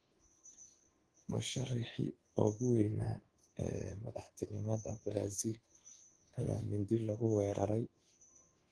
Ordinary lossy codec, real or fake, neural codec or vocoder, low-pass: Opus, 16 kbps; fake; codec, 24 kHz, 1.2 kbps, DualCodec; 10.8 kHz